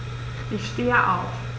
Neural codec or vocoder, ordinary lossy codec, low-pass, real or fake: none; none; none; real